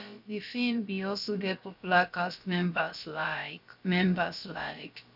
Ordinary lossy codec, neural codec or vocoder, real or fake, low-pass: AAC, 48 kbps; codec, 16 kHz, about 1 kbps, DyCAST, with the encoder's durations; fake; 5.4 kHz